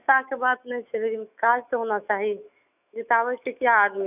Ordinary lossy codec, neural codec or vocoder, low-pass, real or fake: none; autoencoder, 48 kHz, 128 numbers a frame, DAC-VAE, trained on Japanese speech; 3.6 kHz; fake